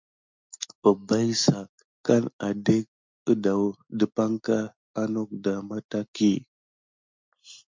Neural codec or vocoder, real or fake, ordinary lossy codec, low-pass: none; real; MP3, 64 kbps; 7.2 kHz